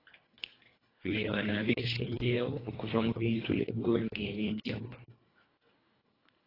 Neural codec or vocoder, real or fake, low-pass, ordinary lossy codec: codec, 24 kHz, 1.5 kbps, HILCodec; fake; 5.4 kHz; AAC, 24 kbps